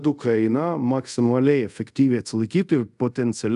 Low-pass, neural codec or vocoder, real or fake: 10.8 kHz; codec, 24 kHz, 0.5 kbps, DualCodec; fake